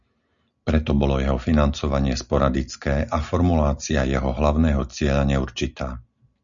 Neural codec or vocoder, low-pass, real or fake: none; 7.2 kHz; real